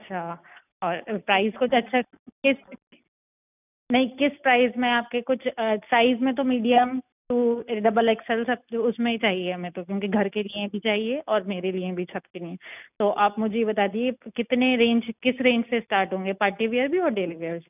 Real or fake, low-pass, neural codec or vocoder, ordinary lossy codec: real; 3.6 kHz; none; none